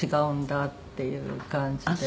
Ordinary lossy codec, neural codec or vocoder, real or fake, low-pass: none; none; real; none